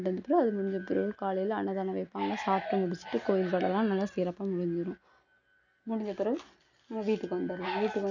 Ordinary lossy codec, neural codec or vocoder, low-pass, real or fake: none; none; 7.2 kHz; real